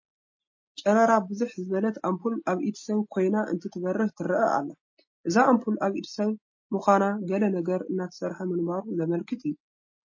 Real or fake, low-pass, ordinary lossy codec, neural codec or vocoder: real; 7.2 kHz; MP3, 32 kbps; none